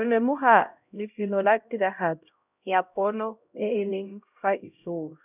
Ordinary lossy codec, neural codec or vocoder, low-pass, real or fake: none; codec, 16 kHz, 0.5 kbps, X-Codec, HuBERT features, trained on LibriSpeech; 3.6 kHz; fake